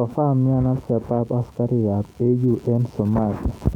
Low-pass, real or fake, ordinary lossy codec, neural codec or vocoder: 19.8 kHz; fake; none; autoencoder, 48 kHz, 128 numbers a frame, DAC-VAE, trained on Japanese speech